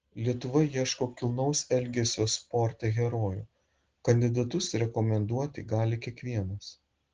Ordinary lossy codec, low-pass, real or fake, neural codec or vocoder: Opus, 16 kbps; 7.2 kHz; real; none